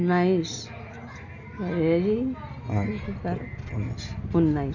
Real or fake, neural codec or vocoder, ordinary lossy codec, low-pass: real; none; none; 7.2 kHz